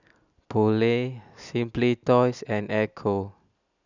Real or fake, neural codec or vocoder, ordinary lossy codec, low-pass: real; none; none; 7.2 kHz